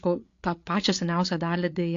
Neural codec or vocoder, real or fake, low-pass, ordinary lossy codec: codec, 16 kHz, 8 kbps, FreqCodec, larger model; fake; 7.2 kHz; AAC, 48 kbps